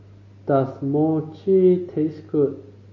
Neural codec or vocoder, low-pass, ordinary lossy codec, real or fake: none; 7.2 kHz; MP3, 32 kbps; real